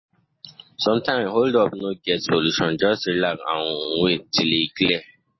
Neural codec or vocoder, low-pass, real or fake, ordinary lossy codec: none; 7.2 kHz; real; MP3, 24 kbps